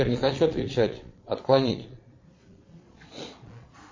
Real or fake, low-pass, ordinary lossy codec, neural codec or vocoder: fake; 7.2 kHz; MP3, 32 kbps; vocoder, 22.05 kHz, 80 mel bands, Vocos